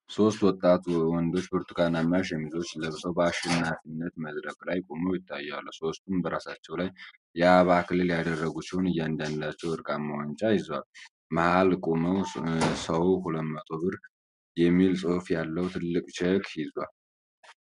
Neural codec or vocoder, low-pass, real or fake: none; 10.8 kHz; real